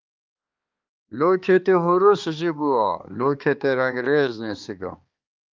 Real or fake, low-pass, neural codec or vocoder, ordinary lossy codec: fake; 7.2 kHz; codec, 16 kHz, 2 kbps, X-Codec, HuBERT features, trained on balanced general audio; Opus, 32 kbps